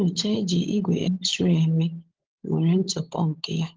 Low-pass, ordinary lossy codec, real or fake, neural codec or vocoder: 7.2 kHz; Opus, 16 kbps; fake; codec, 16 kHz, 16 kbps, FunCodec, trained on LibriTTS, 50 frames a second